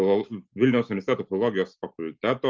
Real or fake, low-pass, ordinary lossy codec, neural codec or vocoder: real; 7.2 kHz; Opus, 24 kbps; none